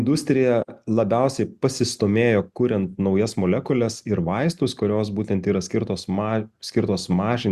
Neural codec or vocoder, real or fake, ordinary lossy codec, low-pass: none; real; Opus, 64 kbps; 14.4 kHz